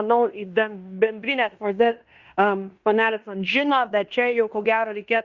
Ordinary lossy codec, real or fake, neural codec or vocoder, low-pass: Opus, 64 kbps; fake; codec, 16 kHz in and 24 kHz out, 0.9 kbps, LongCat-Audio-Codec, fine tuned four codebook decoder; 7.2 kHz